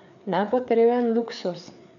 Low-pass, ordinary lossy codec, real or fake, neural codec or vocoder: 7.2 kHz; none; fake; codec, 16 kHz, 4 kbps, FreqCodec, larger model